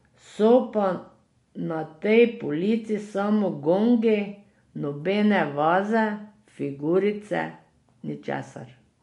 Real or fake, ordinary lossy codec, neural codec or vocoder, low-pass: real; MP3, 48 kbps; none; 14.4 kHz